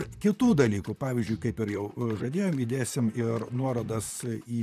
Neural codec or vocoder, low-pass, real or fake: vocoder, 44.1 kHz, 128 mel bands, Pupu-Vocoder; 14.4 kHz; fake